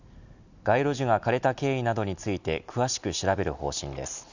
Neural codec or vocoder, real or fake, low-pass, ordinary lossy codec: none; real; 7.2 kHz; none